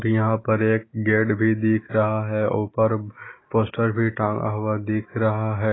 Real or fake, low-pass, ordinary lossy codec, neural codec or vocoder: real; 7.2 kHz; AAC, 16 kbps; none